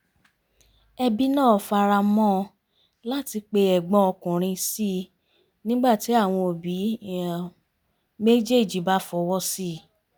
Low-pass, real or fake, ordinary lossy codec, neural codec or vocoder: none; real; none; none